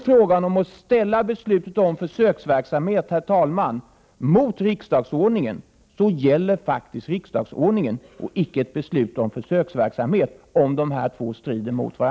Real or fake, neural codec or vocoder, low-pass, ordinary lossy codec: real; none; none; none